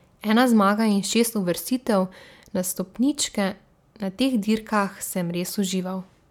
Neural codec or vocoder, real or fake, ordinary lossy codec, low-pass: none; real; none; 19.8 kHz